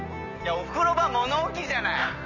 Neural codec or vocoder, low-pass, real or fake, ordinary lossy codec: none; 7.2 kHz; real; none